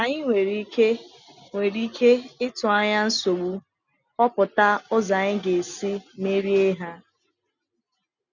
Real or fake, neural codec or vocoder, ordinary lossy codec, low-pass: real; none; none; 7.2 kHz